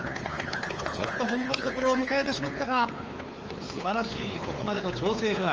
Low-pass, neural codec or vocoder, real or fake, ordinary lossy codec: 7.2 kHz; codec, 16 kHz, 4 kbps, X-Codec, WavLM features, trained on Multilingual LibriSpeech; fake; Opus, 24 kbps